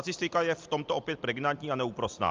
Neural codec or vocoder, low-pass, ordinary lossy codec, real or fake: none; 7.2 kHz; Opus, 24 kbps; real